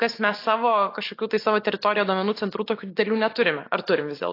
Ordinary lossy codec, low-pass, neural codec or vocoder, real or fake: AAC, 32 kbps; 5.4 kHz; none; real